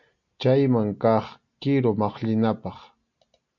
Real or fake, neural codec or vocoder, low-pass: real; none; 7.2 kHz